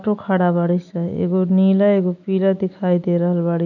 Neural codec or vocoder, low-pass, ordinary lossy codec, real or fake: none; 7.2 kHz; none; real